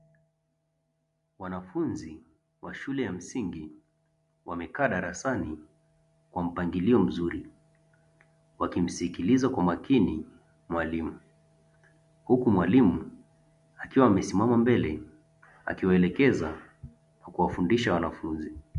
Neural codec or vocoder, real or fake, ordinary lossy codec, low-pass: none; real; MP3, 64 kbps; 10.8 kHz